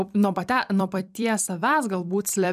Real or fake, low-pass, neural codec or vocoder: fake; 14.4 kHz; vocoder, 44.1 kHz, 128 mel bands every 256 samples, BigVGAN v2